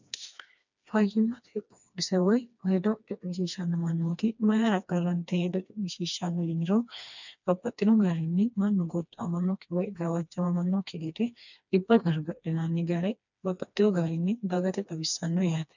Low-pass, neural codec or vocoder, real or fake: 7.2 kHz; codec, 16 kHz, 2 kbps, FreqCodec, smaller model; fake